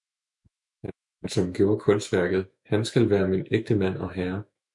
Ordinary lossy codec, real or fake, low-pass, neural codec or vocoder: MP3, 96 kbps; fake; 10.8 kHz; autoencoder, 48 kHz, 128 numbers a frame, DAC-VAE, trained on Japanese speech